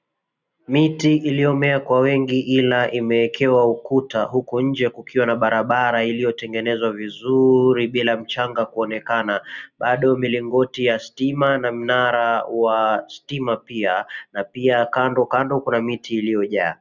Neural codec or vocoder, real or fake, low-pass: none; real; 7.2 kHz